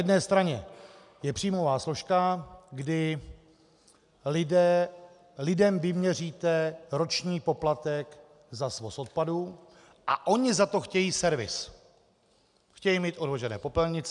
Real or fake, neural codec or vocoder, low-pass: real; none; 10.8 kHz